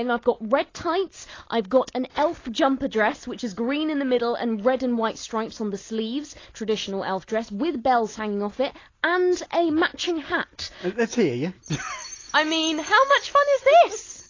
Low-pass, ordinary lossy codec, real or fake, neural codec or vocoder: 7.2 kHz; AAC, 32 kbps; real; none